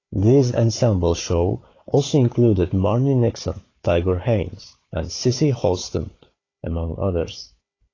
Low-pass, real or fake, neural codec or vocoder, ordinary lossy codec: 7.2 kHz; fake; codec, 16 kHz, 4 kbps, FunCodec, trained on Chinese and English, 50 frames a second; AAC, 32 kbps